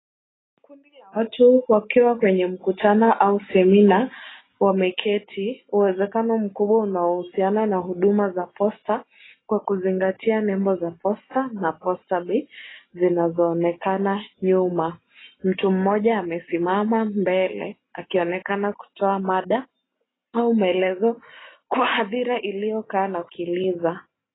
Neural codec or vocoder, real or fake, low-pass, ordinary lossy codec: none; real; 7.2 kHz; AAC, 16 kbps